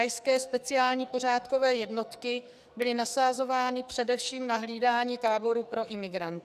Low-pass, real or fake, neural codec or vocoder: 14.4 kHz; fake; codec, 44.1 kHz, 2.6 kbps, SNAC